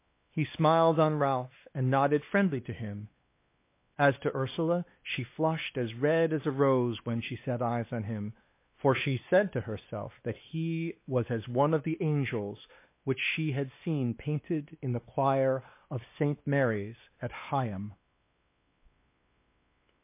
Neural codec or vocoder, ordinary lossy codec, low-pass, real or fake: codec, 16 kHz, 2 kbps, X-Codec, WavLM features, trained on Multilingual LibriSpeech; MP3, 24 kbps; 3.6 kHz; fake